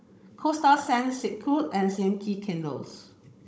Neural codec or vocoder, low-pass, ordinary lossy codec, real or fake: codec, 16 kHz, 16 kbps, FunCodec, trained on Chinese and English, 50 frames a second; none; none; fake